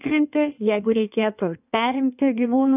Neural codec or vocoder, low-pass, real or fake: codec, 16 kHz in and 24 kHz out, 1.1 kbps, FireRedTTS-2 codec; 3.6 kHz; fake